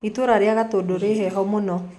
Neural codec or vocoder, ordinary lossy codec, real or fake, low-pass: none; none; real; none